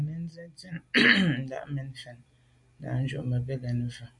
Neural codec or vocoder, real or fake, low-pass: none; real; 10.8 kHz